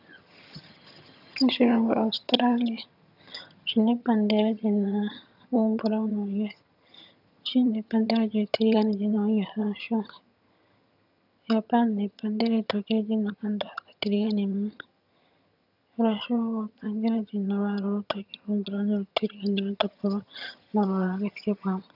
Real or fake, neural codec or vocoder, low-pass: fake; vocoder, 22.05 kHz, 80 mel bands, HiFi-GAN; 5.4 kHz